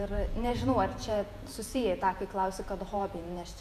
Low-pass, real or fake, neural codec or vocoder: 14.4 kHz; fake; vocoder, 48 kHz, 128 mel bands, Vocos